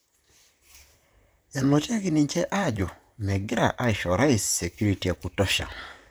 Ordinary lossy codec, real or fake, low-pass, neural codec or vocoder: none; fake; none; vocoder, 44.1 kHz, 128 mel bands, Pupu-Vocoder